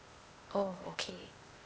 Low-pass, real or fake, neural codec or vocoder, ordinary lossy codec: none; fake; codec, 16 kHz, 0.8 kbps, ZipCodec; none